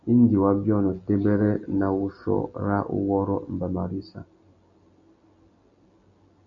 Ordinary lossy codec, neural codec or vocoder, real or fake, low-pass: MP3, 64 kbps; none; real; 7.2 kHz